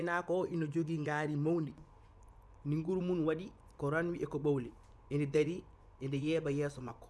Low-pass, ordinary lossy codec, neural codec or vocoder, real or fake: none; none; vocoder, 24 kHz, 100 mel bands, Vocos; fake